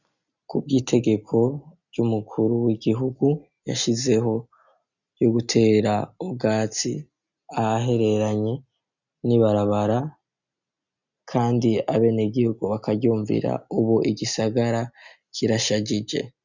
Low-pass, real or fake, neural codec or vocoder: 7.2 kHz; real; none